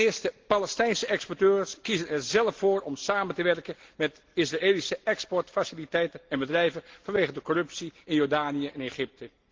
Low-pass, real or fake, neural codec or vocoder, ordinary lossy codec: 7.2 kHz; real; none; Opus, 16 kbps